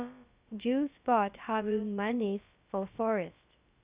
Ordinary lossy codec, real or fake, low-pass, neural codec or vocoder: Opus, 64 kbps; fake; 3.6 kHz; codec, 16 kHz, about 1 kbps, DyCAST, with the encoder's durations